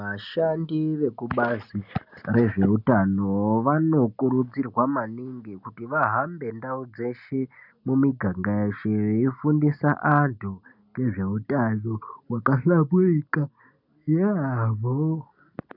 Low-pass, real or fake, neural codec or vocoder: 5.4 kHz; real; none